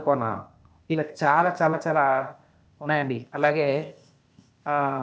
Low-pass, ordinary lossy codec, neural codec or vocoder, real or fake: none; none; codec, 16 kHz, 0.8 kbps, ZipCodec; fake